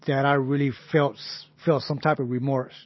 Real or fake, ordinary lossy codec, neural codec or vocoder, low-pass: real; MP3, 24 kbps; none; 7.2 kHz